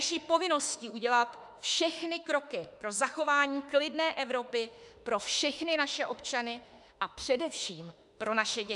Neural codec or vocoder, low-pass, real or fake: autoencoder, 48 kHz, 32 numbers a frame, DAC-VAE, trained on Japanese speech; 10.8 kHz; fake